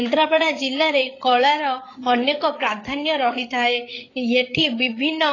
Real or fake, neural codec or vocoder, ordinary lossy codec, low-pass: fake; codec, 16 kHz, 8 kbps, FreqCodec, larger model; AAC, 32 kbps; 7.2 kHz